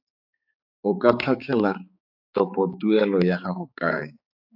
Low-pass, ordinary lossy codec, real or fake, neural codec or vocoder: 5.4 kHz; AAC, 48 kbps; fake; codec, 16 kHz, 4 kbps, X-Codec, HuBERT features, trained on balanced general audio